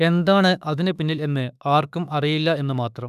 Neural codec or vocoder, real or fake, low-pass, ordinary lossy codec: autoencoder, 48 kHz, 32 numbers a frame, DAC-VAE, trained on Japanese speech; fake; 14.4 kHz; none